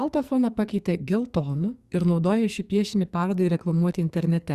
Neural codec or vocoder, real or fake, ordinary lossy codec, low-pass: codec, 44.1 kHz, 2.6 kbps, SNAC; fake; Opus, 64 kbps; 14.4 kHz